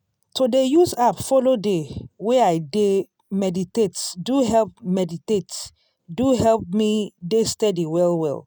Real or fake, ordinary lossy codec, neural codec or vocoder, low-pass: real; none; none; none